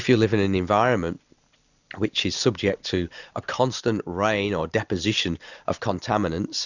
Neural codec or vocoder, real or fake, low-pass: none; real; 7.2 kHz